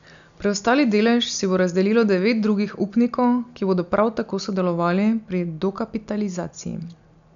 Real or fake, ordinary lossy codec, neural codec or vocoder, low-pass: real; none; none; 7.2 kHz